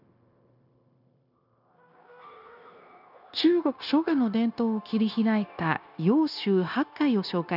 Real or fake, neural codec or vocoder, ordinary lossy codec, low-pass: fake; codec, 16 kHz, 0.9 kbps, LongCat-Audio-Codec; none; 5.4 kHz